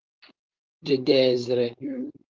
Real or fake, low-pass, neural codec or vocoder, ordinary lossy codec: fake; 7.2 kHz; codec, 16 kHz, 4.8 kbps, FACodec; Opus, 32 kbps